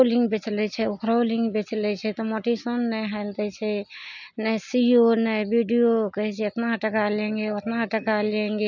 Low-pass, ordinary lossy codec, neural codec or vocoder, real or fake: 7.2 kHz; none; none; real